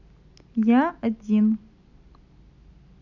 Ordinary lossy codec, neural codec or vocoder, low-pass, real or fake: none; none; 7.2 kHz; real